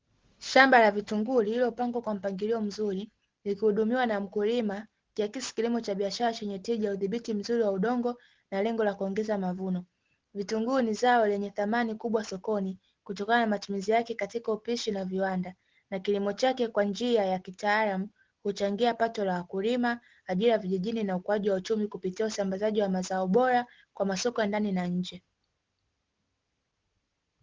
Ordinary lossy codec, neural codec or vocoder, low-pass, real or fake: Opus, 16 kbps; none; 7.2 kHz; real